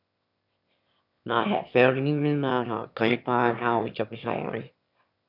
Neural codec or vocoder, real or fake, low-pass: autoencoder, 22.05 kHz, a latent of 192 numbers a frame, VITS, trained on one speaker; fake; 5.4 kHz